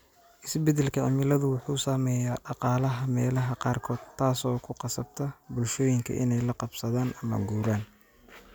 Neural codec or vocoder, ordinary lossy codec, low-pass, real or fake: none; none; none; real